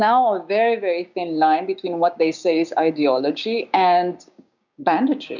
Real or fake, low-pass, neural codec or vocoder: fake; 7.2 kHz; codec, 16 kHz, 6 kbps, DAC